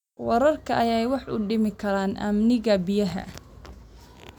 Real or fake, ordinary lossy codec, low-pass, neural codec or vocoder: real; none; 19.8 kHz; none